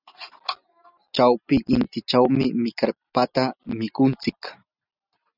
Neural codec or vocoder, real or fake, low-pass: none; real; 5.4 kHz